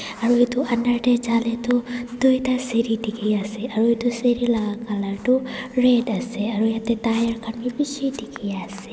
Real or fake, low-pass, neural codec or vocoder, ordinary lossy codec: real; none; none; none